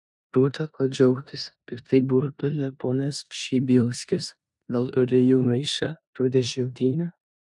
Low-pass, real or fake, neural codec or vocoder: 10.8 kHz; fake; codec, 16 kHz in and 24 kHz out, 0.9 kbps, LongCat-Audio-Codec, four codebook decoder